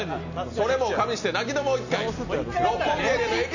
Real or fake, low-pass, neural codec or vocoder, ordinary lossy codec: real; 7.2 kHz; none; none